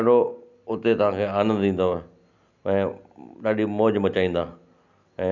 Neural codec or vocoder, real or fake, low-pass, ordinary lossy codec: none; real; 7.2 kHz; none